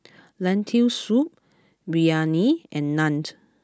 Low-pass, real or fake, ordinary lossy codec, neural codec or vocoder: none; real; none; none